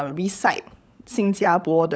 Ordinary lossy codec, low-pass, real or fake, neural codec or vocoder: none; none; fake; codec, 16 kHz, 16 kbps, FunCodec, trained on LibriTTS, 50 frames a second